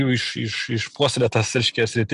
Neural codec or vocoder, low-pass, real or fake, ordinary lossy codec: none; 10.8 kHz; real; MP3, 96 kbps